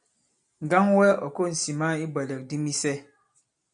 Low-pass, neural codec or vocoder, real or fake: 9.9 kHz; none; real